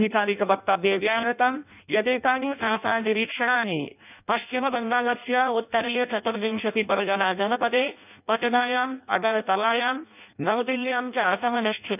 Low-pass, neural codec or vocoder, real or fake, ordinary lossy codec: 3.6 kHz; codec, 16 kHz in and 24 kHz out, 0.6 kbps, FireRedTTS-2 codec; fake; none